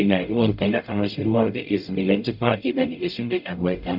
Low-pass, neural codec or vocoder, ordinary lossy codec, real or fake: 5.4 kHz; codec, 44.1 kHz, 0.9 kbps, DAC; none; fake